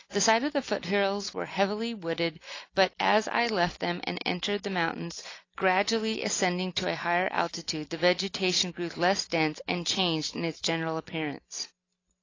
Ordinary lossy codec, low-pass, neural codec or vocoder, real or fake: AAC, 32 kbps; 7.2 kHz; none; real